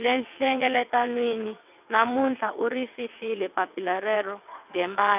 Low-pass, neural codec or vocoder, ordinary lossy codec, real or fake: 3.6 kHz; vocoder, 22.05 kHz, 80 mel bands, WaveNeXt; none; fake